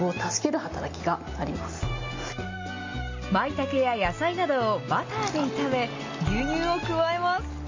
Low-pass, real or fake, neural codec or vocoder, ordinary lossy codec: 7.2 kHz; real; none; MP3, 32 kbps